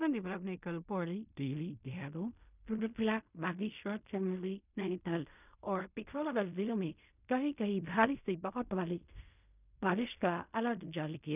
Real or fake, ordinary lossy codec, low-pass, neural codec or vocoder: fake; none; 3.6 kHz; codec, 16 kHz in and 24 kHz out, 0.4 kbps, LongCat-Audio-Codec, fine tuned four codebook decoder